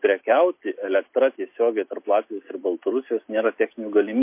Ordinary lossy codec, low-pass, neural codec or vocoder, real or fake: MP3, 24 kbps; 3.6 kHz; none; real